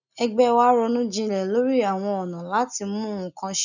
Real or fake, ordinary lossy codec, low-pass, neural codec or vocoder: real; none; 7.2 kHz; none